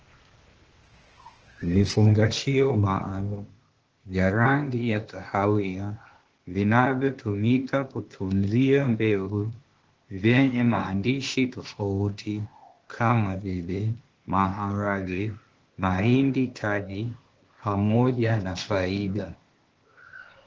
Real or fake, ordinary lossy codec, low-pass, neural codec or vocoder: fake; Opus, 16 kbps; 7.2 kHz; codec, 16 kHz, 0.8 kbps, ZipCodec